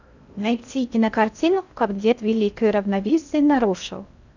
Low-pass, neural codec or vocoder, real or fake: 7.2 kHz; codec, 16 kHz in and 24 kHz out, 0.6 kbps, FocalCodec, streaming, 2048 codes; fake